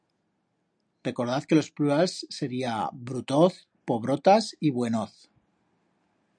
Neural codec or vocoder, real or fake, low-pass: none; real; 9.9 kHz